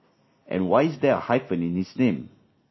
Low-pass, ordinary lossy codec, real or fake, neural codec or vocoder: 7.2 kHz; MP3, 24 kbps; real; none